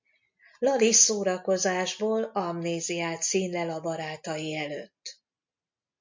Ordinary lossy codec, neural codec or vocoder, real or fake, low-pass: MP3, 48 kbps; none; real; 7.2 kHz